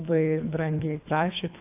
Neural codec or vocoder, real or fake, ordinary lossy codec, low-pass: codec, 24 kHz, 3 kbps, HILCodec; fake; AAC, 32 kbps; 3.6 kHz